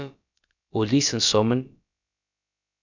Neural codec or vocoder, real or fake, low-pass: codec, 16 kHz, about 1 kbps, DyCAST, with the encoder's durations; fake; 7.2 kHz